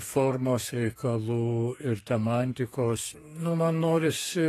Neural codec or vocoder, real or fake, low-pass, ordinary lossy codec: codec, 32 kHz, 1.9 kbps, SNAC; fake; 14.4 kHz; AAC, 48 kbps